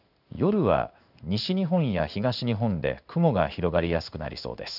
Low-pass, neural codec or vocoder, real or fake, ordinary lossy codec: 5.4 kHz; codec, 16 kHz in and 24 kHz out, 1 kbps, XY-Tokenizer; fake; none